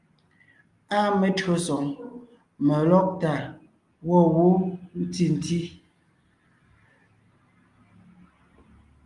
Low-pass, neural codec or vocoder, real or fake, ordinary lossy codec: 10.8 kHz; none; real; Opus, 32 kbps